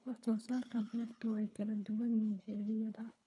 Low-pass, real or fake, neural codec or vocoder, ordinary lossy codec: 10.8 kHz; fake; codec, 24 kHz, 3 kbps, HILCodec; none